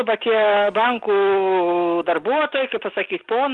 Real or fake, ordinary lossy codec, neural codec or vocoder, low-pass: real; Opus, 24 kbps; none; 10.8 kHz